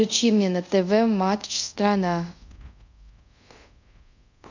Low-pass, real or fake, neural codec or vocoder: 7.2 kHz; fake; codec, 24 kHz, 0.5 kbps, DualCodec